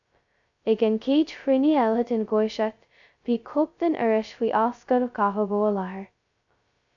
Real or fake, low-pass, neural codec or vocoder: fake; 7.2 kHz; codec, 16 kHz, 0.2 kbps, FocalCodec